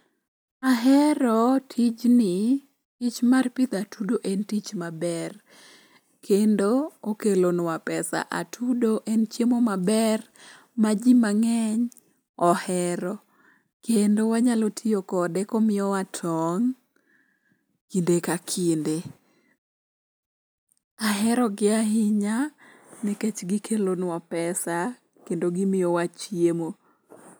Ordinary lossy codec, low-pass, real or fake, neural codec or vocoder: none; none; real; none